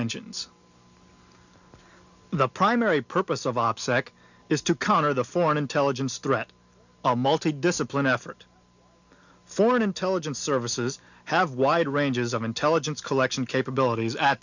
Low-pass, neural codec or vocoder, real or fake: 7.2 kHz; none; real